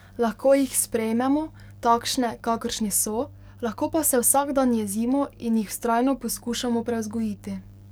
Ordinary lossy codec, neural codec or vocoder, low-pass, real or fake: none; codec, 44.1 kHz, 7.8 kbps, DAC; none; fake